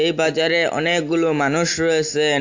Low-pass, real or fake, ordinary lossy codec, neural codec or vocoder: 7.2 kHz; real; AAC, 48 kbps; none